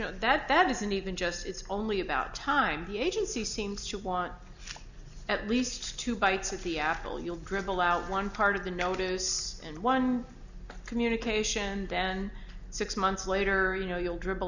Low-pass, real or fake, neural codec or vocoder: 7.2 kHz; real; none